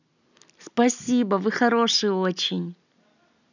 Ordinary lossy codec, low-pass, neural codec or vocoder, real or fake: none; 7.2 kHz; none; real